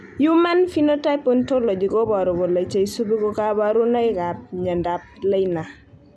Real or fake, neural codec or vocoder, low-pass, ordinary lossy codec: real; none; none; none